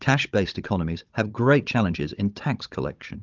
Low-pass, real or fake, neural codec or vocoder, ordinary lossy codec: 7.2 kHz; fake; codec, 16 kHz, 16 kbps, FunCodec, trained on Chinese and English, 50 frames a second; Opus, 32 kbps